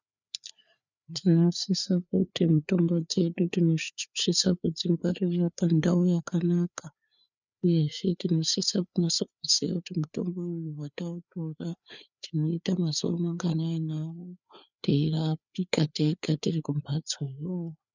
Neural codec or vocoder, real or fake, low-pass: codec, 16 kHz, 4 kbps, FreqCodec, larger model; fake; 7.2 kHz